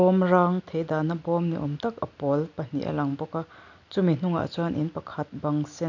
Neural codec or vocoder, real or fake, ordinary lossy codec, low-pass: none; real; none; 7.2 kHz